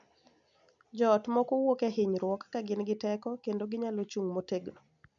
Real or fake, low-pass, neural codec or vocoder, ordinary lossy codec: real; 7.2 kHz; none; none